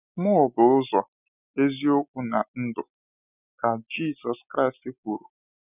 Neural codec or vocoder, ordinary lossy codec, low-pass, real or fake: none; none; 3.6 kHz; real